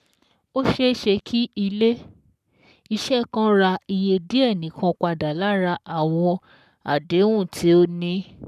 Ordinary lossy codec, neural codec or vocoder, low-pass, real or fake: none; codec, 44.1 kHz, 7.8 kbps, Pupu-Codec; 14.4 kHz; fake